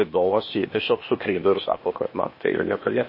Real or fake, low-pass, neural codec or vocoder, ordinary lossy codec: fake; 5.4 kHz; codec, 16 kHz, 0.8 kbps, ZipCodec; MP3, 24 kbps